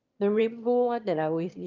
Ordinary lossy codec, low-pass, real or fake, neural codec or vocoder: Opus, 24 kbps; 7.2 kHz; fake; autoencoder, 22.05 kHz, a latent of 192 numbers a frame, VITS, trained on one speaker